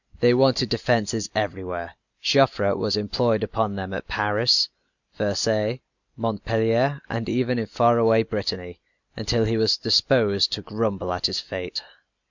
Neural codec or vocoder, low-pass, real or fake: none; 7.2 kHz; real